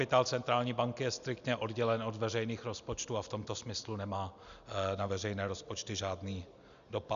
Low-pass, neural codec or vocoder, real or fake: 7.2 kHz; none; real